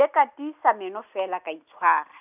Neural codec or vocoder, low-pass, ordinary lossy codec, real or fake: none; 3.6 kHz; none; real